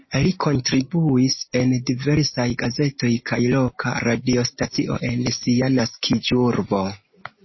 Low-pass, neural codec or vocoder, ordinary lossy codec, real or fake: 7.2 kHz; none; MP3, 24 kbps; real